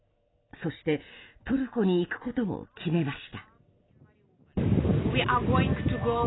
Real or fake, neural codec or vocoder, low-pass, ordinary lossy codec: real; none; 7.2 kHz; AAC, 16 kbps